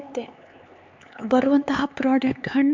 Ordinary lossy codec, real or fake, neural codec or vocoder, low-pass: MP3, 64 kbps; fake; codec, 16 kHz, 4 kbps, X-Codec, HuBERT features, trained on LibriSpeech; 7.2 kHz